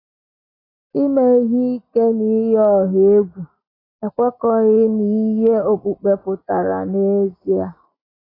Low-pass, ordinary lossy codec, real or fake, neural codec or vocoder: 5.4 kHz; AAC, 24 kbps; real; none